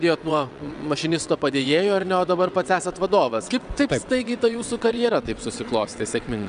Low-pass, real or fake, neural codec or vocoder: 9.9 kHz; fake; vocoder, 22.05 kHz, 80 mel bands, WaveNeXt